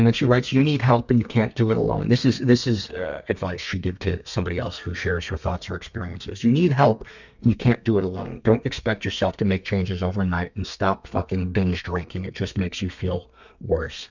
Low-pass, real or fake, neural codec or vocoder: 7.2 kHz; fake; codec, 32 kHz, 1.9 kbps, SNAC